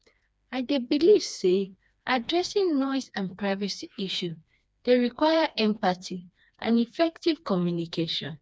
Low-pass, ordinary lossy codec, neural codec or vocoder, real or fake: none; none; codec, 16 kHz, 2 kbps, FreqCodec, smaller model; fake